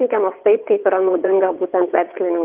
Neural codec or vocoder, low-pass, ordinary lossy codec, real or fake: vocoder, 22.05 kHz, 80 mel bands, WaveNeXt; 3.6 kHz; Opus, 32 kbps; fake